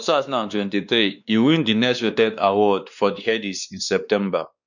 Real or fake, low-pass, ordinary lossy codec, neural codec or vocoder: fake; 7.2 kHz; none; codec, 16 kHz, 2 kbps, X-Codec, WavLM features, trained on Multilingual LibriSpeech